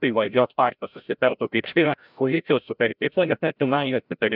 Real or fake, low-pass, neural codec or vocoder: fake; 5.4 kHz; codec, 16 kHz, 0.5 kbps, FreqCodec, larger model